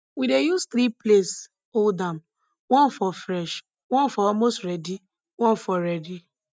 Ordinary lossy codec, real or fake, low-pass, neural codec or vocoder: none; real; none; none